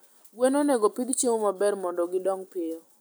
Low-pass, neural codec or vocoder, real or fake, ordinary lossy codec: none; none; real; none